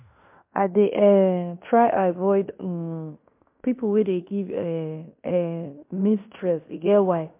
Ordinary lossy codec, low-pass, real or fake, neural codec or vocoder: MP3, 32 kbps; 3.6 kHz; fake; codec, 16 kHz in and 24 kHz out, 0.9 kbps, LongCat-Audio-Codec, fine tuned four codebook decoder